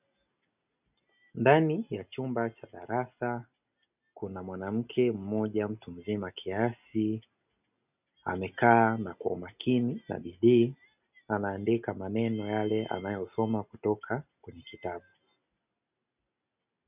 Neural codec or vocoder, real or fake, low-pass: none; real; 3.6 kHz